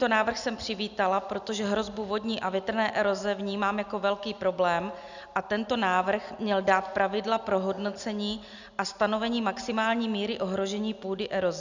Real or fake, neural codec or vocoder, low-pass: real; none; 7.2 kHz